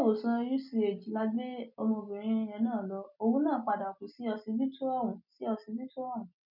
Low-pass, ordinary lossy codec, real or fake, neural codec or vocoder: 5.4 kHz; none; real; none